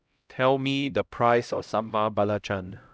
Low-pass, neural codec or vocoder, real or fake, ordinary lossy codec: none; codec, 16 kHz, 0.5 kbps, X-Codec, HuBERT features, trained on LibriSpeech; fake; none